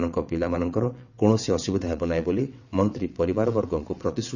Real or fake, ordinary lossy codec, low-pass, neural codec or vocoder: fake; none; 7.2 kHz; vocoder, 22.05 kHz, 80 mel bands, WaveNeXt